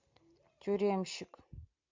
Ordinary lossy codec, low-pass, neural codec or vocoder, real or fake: MP3, 64 kbps; 7.2 kHz; none; real